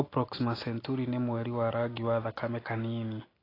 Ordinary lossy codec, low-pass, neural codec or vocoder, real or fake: AAC, 24 kbps; 5.4 kHz; none; real